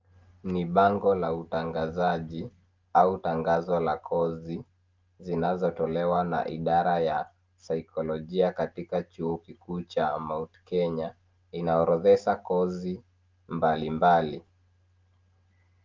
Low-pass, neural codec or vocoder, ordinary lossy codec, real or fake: 7.2 kHz; none; Opus, 24 kbps; real